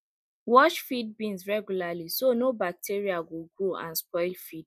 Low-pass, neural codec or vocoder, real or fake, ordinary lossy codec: 14.4 kHz; none; real; none